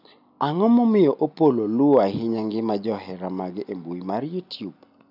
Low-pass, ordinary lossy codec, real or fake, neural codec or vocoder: 5.4 kHz; none; real; none